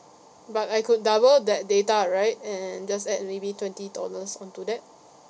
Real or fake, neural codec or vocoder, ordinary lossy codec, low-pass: real; none; none; none